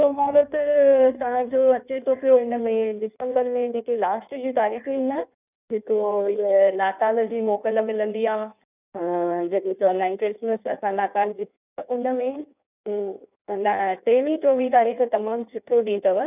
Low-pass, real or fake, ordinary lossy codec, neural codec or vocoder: 3.6 kHz; fake; none; codec, 16 kHz in and 24 kHz out, 1.1 kbps, FireRedTTS-2 codec